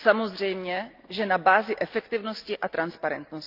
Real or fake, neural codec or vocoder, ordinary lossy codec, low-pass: real; none; Opus, 24 kbps; 5.4 kHz